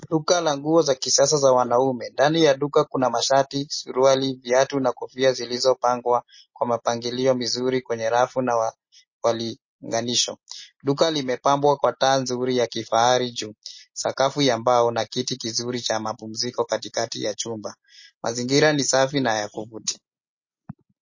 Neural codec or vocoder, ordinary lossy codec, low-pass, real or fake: none; MP3, 32 kbps; 7.2 kHz; real